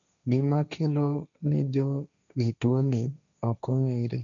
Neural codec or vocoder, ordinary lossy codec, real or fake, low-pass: codec, 16 kHz, 1.1 kbps, Voila-Tokenizer; none; fake; 7.2 kHz